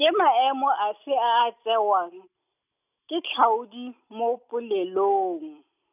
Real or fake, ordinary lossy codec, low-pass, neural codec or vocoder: real; none; 3.6 kHz; none